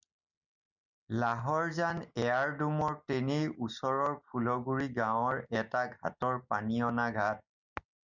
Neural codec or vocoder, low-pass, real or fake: none; 7.2 kHz; real